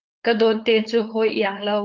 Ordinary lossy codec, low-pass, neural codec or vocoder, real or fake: Opus, 32 kbps; 7.2 kHz; codec, 16 kHz, 4.8 kbps, FACodec; fake